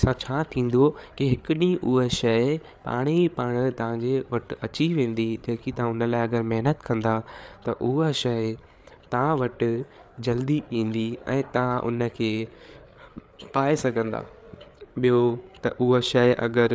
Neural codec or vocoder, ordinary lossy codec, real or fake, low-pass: codec, 16 kHz, 8 kbps, FreqCodec, larger model; none; fake; none